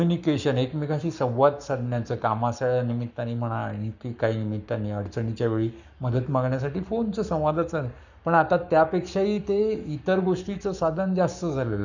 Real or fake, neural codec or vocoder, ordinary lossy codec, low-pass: fake; codec, 44.1 kHz, 7.8 kbps, Pupu-Codec; none; 7.2 kHz